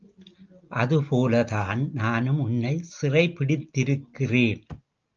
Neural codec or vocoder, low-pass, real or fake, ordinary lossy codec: none; 7.2 kHz; real; Opus, 24 kbps